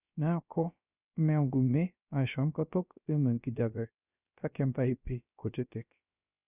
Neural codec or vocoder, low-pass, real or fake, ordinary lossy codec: codec, 16 kHz, 0.3 kbps, FocalCodec; 3.6 kHz; fake; none